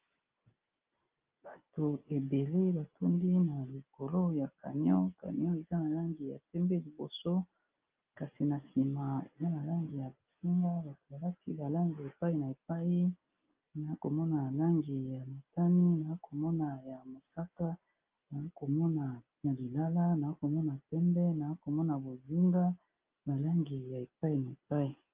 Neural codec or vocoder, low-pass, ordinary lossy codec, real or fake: none; 3.6 kHz; Opus, 24 kbps; real